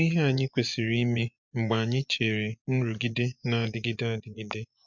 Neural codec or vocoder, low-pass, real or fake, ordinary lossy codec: vocoder, 24 kHz, 100 mel bands, Vocos; 7.2 kHz; fake; MP3, 64 kbps